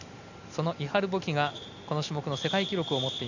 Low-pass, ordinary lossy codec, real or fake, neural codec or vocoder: 7.2 kHz; none; real; none